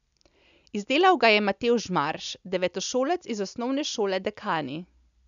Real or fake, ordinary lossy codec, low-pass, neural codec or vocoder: real; none; 7.2 kHz; none